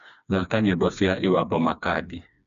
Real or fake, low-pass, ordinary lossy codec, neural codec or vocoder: fake; 7.2 kHz; none; codec, 16 kHz, 2 kbps, FreqCodec, smaller model